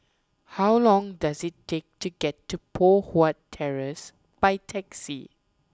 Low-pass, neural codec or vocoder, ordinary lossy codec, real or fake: none; none; none; real